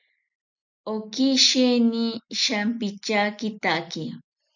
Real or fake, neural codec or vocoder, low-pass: real; none; 7.2 kHz